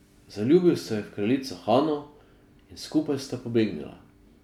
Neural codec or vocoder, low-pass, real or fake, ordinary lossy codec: none; 19.8 kHz; real; none